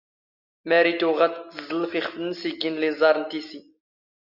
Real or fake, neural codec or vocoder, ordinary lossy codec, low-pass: real; none; Opus, 64 kbps; 5.4 kHz